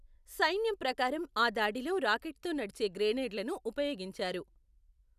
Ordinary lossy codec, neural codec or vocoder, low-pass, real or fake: none; none; 14.4 kHz; real